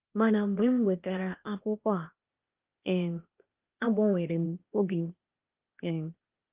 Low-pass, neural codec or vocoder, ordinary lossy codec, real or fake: 3.6 kHz; codec, 16 kHz, 0.8 kbps, ZipCodec; Opus, 32 kbps; fake